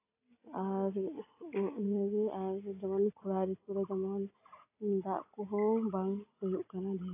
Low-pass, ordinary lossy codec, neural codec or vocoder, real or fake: 3.6 kHz; AAC, 24 kbps; none; real